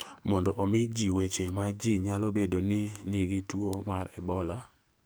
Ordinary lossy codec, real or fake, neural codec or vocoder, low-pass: none; fake; codec, 44.1 kHz, 2.6 kbps, SNAC; none